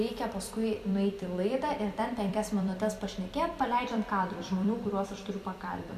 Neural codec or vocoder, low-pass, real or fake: vocoder, 44.1 kHz, 128 mel bands every 256 samples, BigVGAN v2; 14.4 kHz; fake